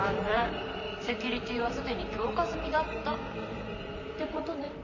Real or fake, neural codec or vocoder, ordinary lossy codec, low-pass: fake; vocoder, 44.1 kHz, 128 mel bands, Pupu-Vocoder; none; 7.2 kHz